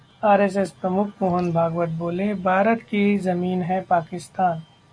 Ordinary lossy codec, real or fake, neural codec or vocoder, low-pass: AAC, 64 kbps; real; none; 9.9 kHz